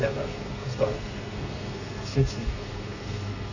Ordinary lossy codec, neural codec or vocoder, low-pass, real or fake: none; codec, 32 kHz, 1.9 kbps, SNAC; 7.2 kHz; fake